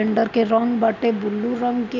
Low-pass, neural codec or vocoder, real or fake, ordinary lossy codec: 7.2 kHz; none; real; none